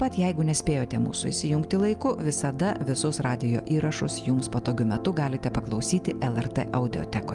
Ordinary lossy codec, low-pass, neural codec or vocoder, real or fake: Opus, 32 kbps; 10.8 kHz; none; real